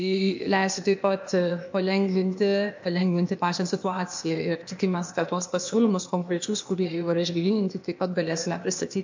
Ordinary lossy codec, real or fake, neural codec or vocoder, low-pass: MP3, 48 kbps; fake; codec, 16 kHz, 0.8 kbps, ZipCodec; 7.2 kHz